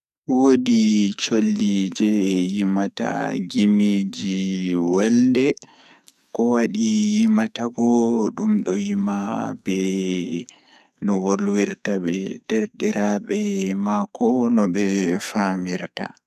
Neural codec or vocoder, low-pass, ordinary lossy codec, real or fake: codec, 44.1 kHz, 2.6 kbps, SNAC; 14.4 kHz; none; fake